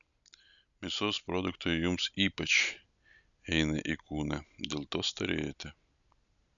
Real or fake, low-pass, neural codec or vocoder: real; 7.2 kHz; none